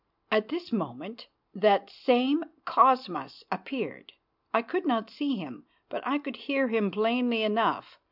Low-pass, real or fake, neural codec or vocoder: 5.4 kHz; real; none